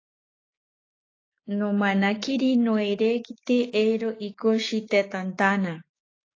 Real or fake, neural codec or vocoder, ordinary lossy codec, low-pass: fake; codec, 16 kHz, 6 kbps, DAC; AAC, 32 kbps; 7.2 kHz